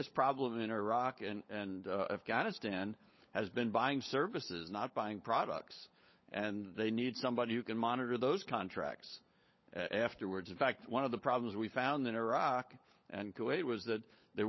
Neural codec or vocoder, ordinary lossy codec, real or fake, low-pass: codec, 16 kHz, 16 kbps, FunCodec, trained on LibriTTS, 50 frames a second; MP3, 24 kbps; fake; 7.2 kHz